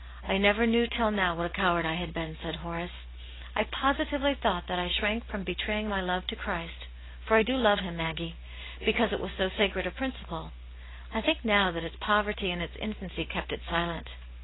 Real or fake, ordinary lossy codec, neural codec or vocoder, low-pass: real; AAC, 16 kbps; none; 7.2 kHz